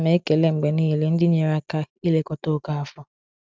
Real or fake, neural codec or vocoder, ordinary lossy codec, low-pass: real; none; none; none